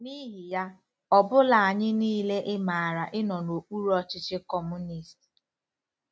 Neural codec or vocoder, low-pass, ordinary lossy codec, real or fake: none; none; none; real